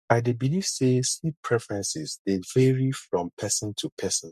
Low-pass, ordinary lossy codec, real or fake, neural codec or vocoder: 14.4 kHz; MP3, 64 kbps; fake; codec, 44.1 kHz, 7.8 kbps, Pupu-Codec